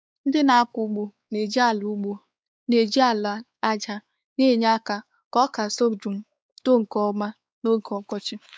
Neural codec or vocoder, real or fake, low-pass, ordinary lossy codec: codec, 16 kHz, 4 kbps, X-Codec, WavLM features, trained on Multilingual LibriSpeech; fake; none; none